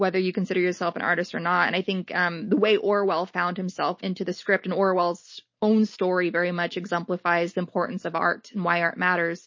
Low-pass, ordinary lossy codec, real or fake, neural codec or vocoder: 7.2 kHz; MP3, 32 kbps; real; none